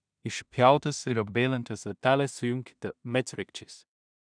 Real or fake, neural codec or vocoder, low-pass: fake; codec, 16 kHz in and 24 kHz out, 0.4 kbps, LongCat-Audio-Codec, two codebook decoder; 9.9 kHz